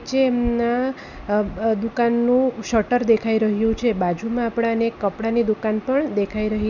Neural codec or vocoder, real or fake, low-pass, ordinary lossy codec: none; real; 7.2 kHz; none